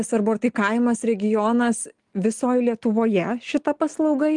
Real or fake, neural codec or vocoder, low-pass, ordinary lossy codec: real; none; 10.8 kHz; Opus, 24 kbps